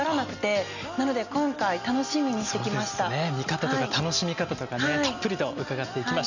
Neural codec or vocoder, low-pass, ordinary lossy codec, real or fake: none; 7.2 kHz; none; real